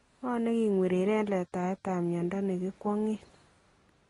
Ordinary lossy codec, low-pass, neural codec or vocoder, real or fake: AAC, 32 kbps; 10.8 kHz; none; real